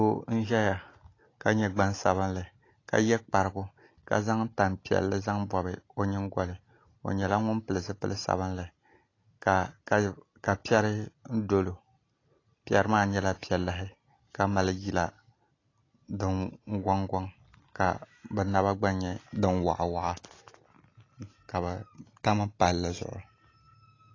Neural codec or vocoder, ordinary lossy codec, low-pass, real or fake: none; AAC, 32 kbps; 7.2 kHz; real